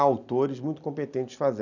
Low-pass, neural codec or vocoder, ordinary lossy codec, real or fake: 7.2 kHz; none; none; real